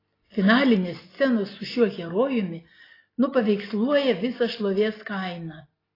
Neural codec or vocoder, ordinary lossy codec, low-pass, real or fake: none; AAC, 24 kbps; 5.4 kHz; real